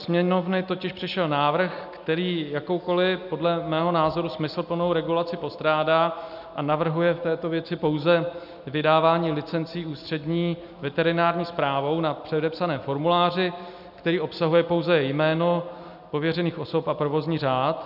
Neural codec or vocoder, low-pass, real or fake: none; 5.4 kHz; real